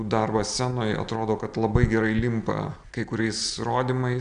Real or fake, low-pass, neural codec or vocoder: real; 9.9 kHz; none